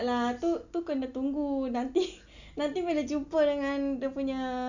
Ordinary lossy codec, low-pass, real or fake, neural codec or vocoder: none; 7.2 kHz; real; none